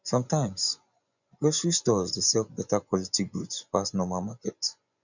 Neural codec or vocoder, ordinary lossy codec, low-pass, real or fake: vocoder, 24 kHz, 100 mel bands, Vocos; none; 7.2 kHz; fake